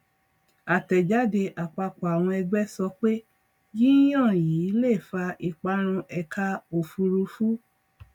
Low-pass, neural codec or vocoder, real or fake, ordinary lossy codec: 19.8 kHz; none; real; none